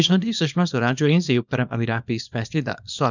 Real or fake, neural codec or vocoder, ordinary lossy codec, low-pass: fake; codec, 24 kHz, 0.9 kbps, WavTokenizer, small release; none; 7.2 kHz